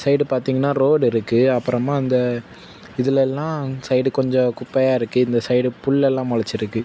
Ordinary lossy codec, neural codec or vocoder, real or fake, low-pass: none; none; real; none